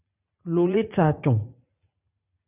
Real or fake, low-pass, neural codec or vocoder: fake; 3.6 kHz; vocoder, 44.1 kHz, 80 mel bands, Vocos